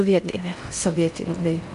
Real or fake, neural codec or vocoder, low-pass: fake; codec, 16 kHz in and 24 kHz out, 0.6 kbps, FocalCodec, streaming, 4096 codes; 10.8 kHz